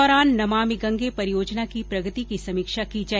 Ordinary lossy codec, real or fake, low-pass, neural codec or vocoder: none; real; none; none